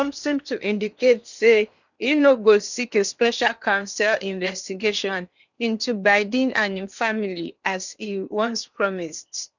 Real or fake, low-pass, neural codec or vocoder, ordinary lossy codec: fake; 7.2 kHz; codec, 16 kHz in and 24 kHz out, 0.8 kbps, FocalCodec, streaming, 65536 codes; none